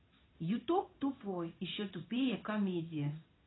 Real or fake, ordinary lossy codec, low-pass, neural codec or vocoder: fake; AAC, 16 kbps; 7.2 kHz; codec, 16 kHz in and 24 kHz out, 1 kbps, XY-Tokenizer